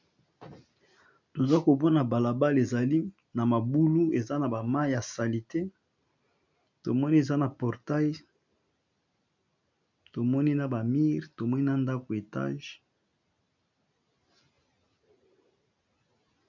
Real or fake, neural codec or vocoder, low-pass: real; none; 7.2 kHz